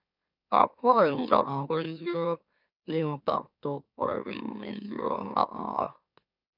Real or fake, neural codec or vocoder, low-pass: fake; autoencoder, 44.1 kHz, a latent of 192 numbers a frame, MeloTTS; 5.4 kHz